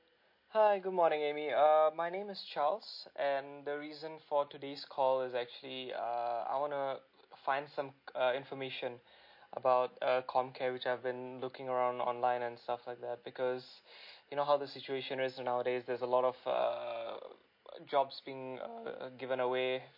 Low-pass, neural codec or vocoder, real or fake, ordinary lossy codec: 5.4 kHz; none; real; MP3, 32 kbps